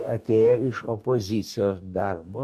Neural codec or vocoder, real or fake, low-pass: codec, 44.1 kHz, 2.6 kbps, DAC; fake; 14.4 kHz